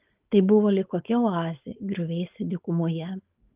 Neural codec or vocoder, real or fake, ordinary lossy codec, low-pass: codec, 16 kHz, 4.8 kbps, FACodec; fake; Opus, 32 kbps; 3.6 kHz